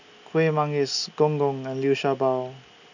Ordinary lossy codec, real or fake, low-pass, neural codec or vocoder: none; real; 7.2 kHz; none